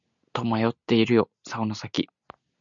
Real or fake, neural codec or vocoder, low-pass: real; none; 7.2 kHz